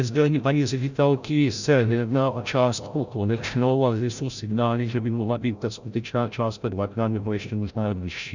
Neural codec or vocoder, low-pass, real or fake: codec, 16 kHz, 0.5 kbps, FreqCodec, larger model; 7.2 kHz; fake